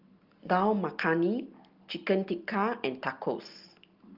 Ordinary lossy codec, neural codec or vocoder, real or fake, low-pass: Opus, 24 kbps; none; real; 5.4 kHz